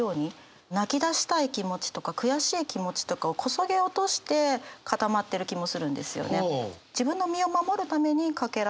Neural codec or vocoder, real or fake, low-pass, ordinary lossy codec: none; real; none; none